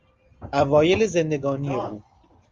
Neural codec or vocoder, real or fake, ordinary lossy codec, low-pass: none; real; Opus, 32 kbps; 7.2 kHz